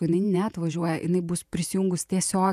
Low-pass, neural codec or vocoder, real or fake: 14.4 kHz; vocoder, 48 kHz, 128 mel bands, Vocos; fake